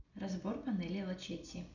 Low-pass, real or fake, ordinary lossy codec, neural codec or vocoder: 7.2 kHz; real; none; none